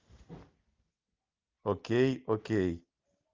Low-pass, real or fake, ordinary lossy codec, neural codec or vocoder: 7.2 kHz; real; Opus, 24 kbps; none